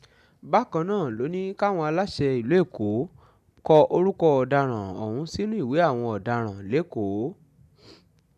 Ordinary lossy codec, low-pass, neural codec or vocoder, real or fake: none; 14.4 kHz; none; real